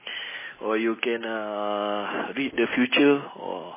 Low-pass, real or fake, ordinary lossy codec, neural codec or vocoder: 3.6 kHz; real; MP3, 16 kbps; none